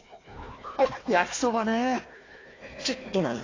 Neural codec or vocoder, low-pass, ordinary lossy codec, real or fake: codec, 16 kHz, 1 kbps, FunCodec, trained on Chinese and English, 50 frames a second; 7.2 kHz; AAC, 32 kbps; fake